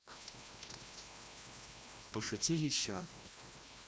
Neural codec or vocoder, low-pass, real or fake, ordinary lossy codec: codec, 16 kHz, 1 kbps, FreqCodec, larger model; none; fake; none